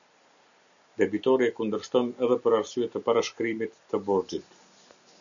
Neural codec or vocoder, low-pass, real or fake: none; 7.2 kHz; real